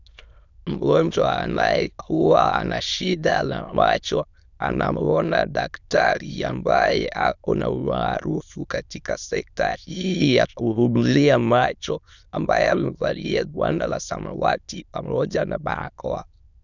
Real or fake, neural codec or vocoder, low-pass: fake; autoencoder, 22.05 kHz, a latent of 192 numbers a frame, VITS, trained on many speakers; 7.2 kHz